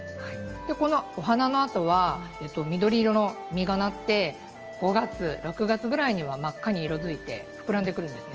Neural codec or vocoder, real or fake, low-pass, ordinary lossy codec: none; real; 7.2 kHz; Opus, 24 kbps